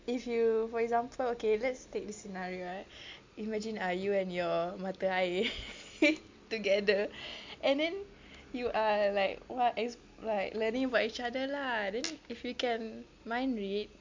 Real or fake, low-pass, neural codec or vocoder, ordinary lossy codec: real; 7.2 kHz; none; MP3, 64 kbps